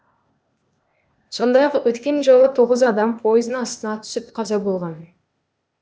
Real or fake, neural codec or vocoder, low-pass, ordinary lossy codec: fake; codec, 16 kHz, 0.8 kbps, ZipCodec; none; none